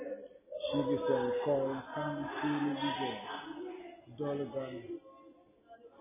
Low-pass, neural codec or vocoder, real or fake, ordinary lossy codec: 3.6 kHz; none; real; MP3, 16 kbps